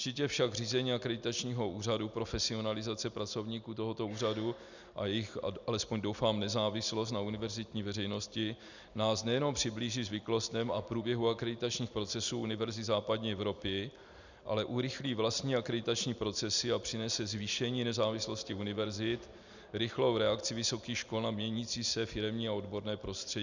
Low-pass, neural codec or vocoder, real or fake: 7.2 kHz; none; real